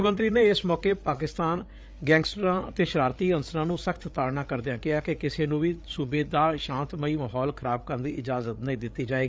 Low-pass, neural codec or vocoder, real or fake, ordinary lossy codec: none; codec, 16 kHz, 8 kbps, FreqCodec, larger model; fake; none